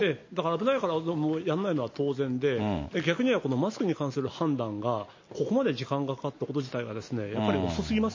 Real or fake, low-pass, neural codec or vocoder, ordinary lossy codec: real; 7.2 kHz; none; MP3, 32 kbps